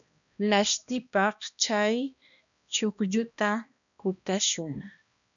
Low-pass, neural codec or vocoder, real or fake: 7.2 kHz; codec, 16 kHz, 1 kbps, X-Codec, HuBERT features, trained on balanced general audio; fake